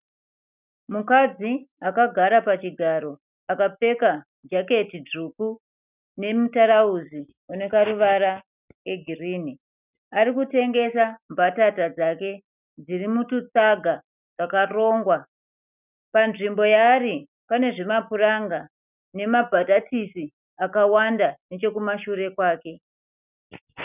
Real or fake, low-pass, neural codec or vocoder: real; 3.6 kHz; none